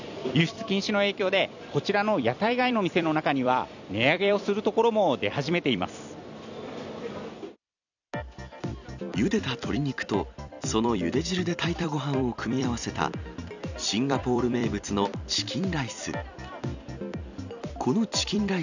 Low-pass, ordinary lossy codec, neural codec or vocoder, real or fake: 7.2 kHz; none; vocoder, 44.1 kHz, 128 mel bands every 256 samples, BigVGAN v2; fake